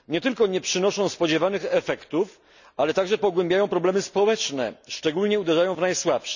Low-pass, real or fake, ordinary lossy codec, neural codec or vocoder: 7.2 kHz; real; none; none